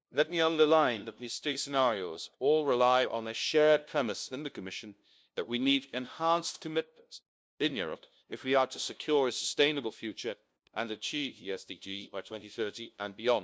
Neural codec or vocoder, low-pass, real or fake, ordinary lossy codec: codec, 16 kHz, 0.5 kbps, FunCodec, trained on LibriTTS, 25 frames a second; none; fake; none